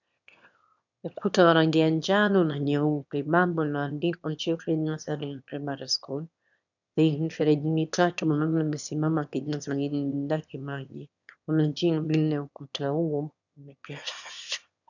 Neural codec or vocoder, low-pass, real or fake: autoencoder, 22.05 kHz, a latent of 192 numbers a frame, VITS, trained on one speaker; 7.2 kHz; fake